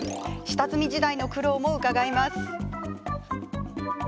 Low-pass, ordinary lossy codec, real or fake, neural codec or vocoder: none; none; real; none